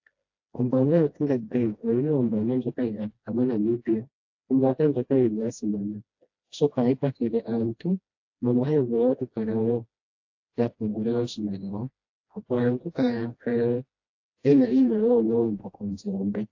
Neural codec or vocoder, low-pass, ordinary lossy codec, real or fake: codec, 16 kHz, 1 kbps, FreqCodec, smaller model; 7.2 kHz; AAC, 48 kbps; fake